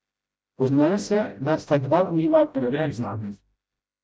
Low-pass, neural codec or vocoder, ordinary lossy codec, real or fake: none; codec, 16 kHz, 0.5 kbps, FreqCodec, smaller model; none; fake